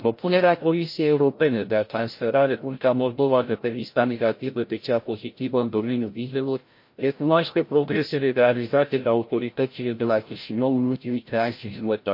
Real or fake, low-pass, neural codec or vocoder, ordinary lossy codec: fake; 5.4 kHz; codec, 16 kHz, 0.5 kbps, FreqCodec, larger model; MP3, 32 kbps